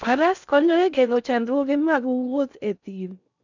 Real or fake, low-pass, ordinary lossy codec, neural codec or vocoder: fake; 7.2 kHz; none; codec, 16 kHz in and 24 kHz out, 0.6 kbps, FocalCodec, streaming, 4096 codes